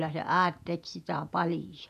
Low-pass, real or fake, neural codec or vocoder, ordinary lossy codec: 14.4 kHz; fake; vocoder, 48 kHz, 128 mel bands, Vocos; none